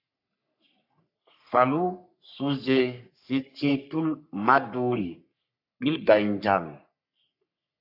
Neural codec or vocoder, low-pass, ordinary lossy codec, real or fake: codec, 44.1 kHz, 3.4 kbps, Pupu-Codec; 5.4 kHz; AAC, 48 kbps; fake